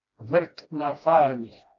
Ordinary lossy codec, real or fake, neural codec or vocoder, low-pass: AAC, 48 kbps; fake; codec, 16 kHz, 1 kbps, FreqCodec, smaller model; 7.2 kHz